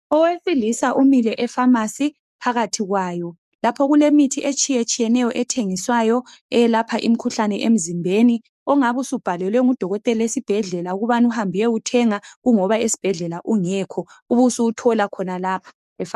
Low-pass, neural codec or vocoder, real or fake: 14.4 kHz; codec, 44.1 kHz, 7.8 kbps, DAC; fake